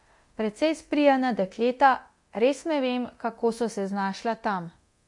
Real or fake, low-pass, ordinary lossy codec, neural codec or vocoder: fake; 10.8 kHz; MP3, 48 kbps; codec, 24 kHz, 0.9 kbps, DualCodec